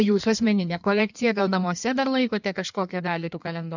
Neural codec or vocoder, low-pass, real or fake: codec, 16 kHz in and 24 kHz out, 1.1 kbps, FireRedTTS-2 codec; 7.2 kHz; fake